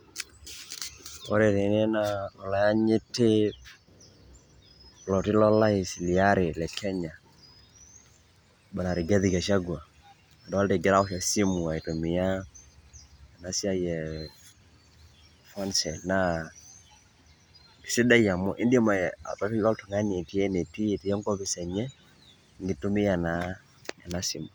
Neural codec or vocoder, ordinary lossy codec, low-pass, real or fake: none; none; none; real